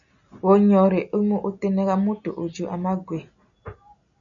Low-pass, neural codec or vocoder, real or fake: 7.2 kHz; none; real